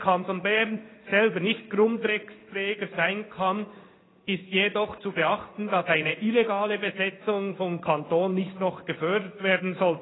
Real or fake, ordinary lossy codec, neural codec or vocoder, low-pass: fake; AAC, 16 kbps; codec, 16 kHz in and 24 kHz out, 1 kbps, XY-Tokenizer; 7.2 kHz